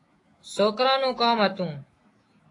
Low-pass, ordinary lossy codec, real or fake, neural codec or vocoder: 10.8 kHz; AAC, 32 kbps; fake; autoencoder, 48 kHz, 128 numbers a frame, DAC-VAE, trained on Japanese speech